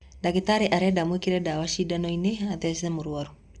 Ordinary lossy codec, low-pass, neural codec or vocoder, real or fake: Opus, 64 kbps; 10.8 kHz; none; real